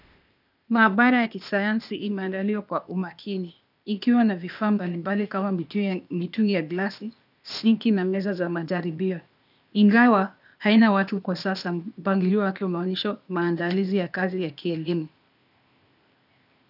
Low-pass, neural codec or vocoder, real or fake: 5.4 kHz; codec, 16 kHz, 0.8 kbps, ZipCodec; fake